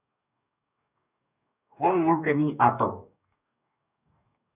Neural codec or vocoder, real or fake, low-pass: codec, 44.1 kHz, 2.6 kbps, DAC; fake; 3.6 kHz